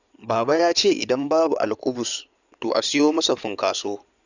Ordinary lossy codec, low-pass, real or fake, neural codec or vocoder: none; 7.2 kHz; fake; codec, 16 kHz in and 24 kHz out, 2.2 kbps, FireRedTTS-2 codec